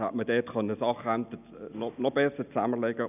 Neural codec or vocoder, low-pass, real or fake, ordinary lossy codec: none; 3.6 kHz; real; none